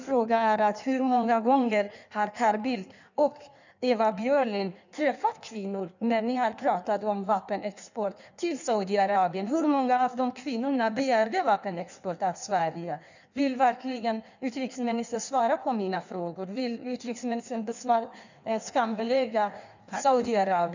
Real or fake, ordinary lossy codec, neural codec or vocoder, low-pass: fake; none; codec, 16 kHz in and 24 kHz out, 1.1 kbps, FireRedTTS-2 codec; 7.2 kHz